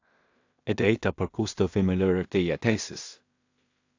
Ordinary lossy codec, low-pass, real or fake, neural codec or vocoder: AAC, 48 kbps; 7.2 kHz; fake; codec, 16 kHz in and 24 kHz out, 0.4 kbps, LongCat-Audio-Codec, two codebook decoder